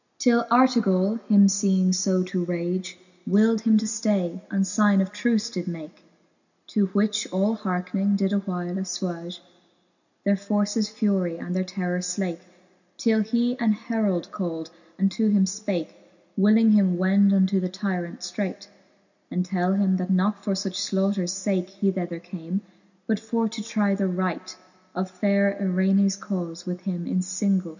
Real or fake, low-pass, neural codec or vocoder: real; 7.2 kHz; none